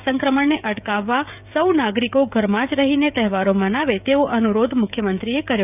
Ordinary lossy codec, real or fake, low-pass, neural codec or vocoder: none; fake; 3.6 kHz; codec, 16 kHz, 16 kbps, FreqCodec, smaller model